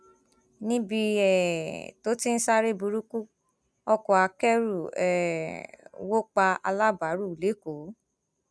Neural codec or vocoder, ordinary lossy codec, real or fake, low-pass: none; none; real; none